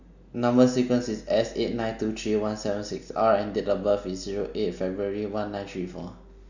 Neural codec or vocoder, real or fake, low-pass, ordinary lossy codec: none; real; 7.2 kHz; none